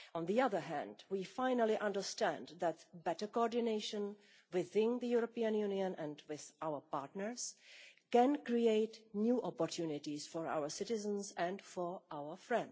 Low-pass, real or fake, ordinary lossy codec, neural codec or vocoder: none; real; none; none